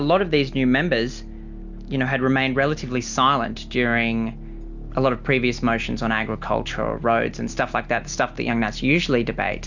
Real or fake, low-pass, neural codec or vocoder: real; 7.2 kHz; none